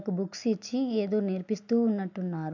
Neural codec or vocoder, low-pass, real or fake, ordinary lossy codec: none; 7.2 kHz; real; MP3, 64 kbps